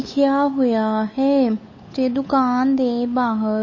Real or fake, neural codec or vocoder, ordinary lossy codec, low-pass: fake; codec, 16 kHz, 8 kbps, FunCodec, trained on Chinese and English, 25 frames a second; MP3, 32 kbps; 7.2 kHz